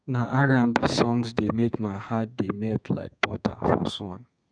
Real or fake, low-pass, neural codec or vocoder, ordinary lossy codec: fake; 9.9 kHz; codec, 32 kHz, 1.9 kbps, SNAC; none